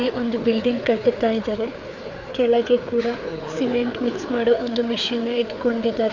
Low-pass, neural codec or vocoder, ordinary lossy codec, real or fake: 7.2 kHz; codec, 16 kHz, 4 kbps, FreqCodec, larger model; none; fake